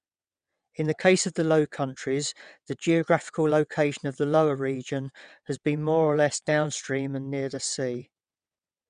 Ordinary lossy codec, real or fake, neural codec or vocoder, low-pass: none; fake; vocoder, 22.05 kHz, 80 mel bands, WaveNeXt; 9.9 kHz